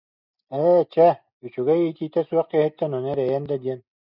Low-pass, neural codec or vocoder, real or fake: 5.4 kHz; none; real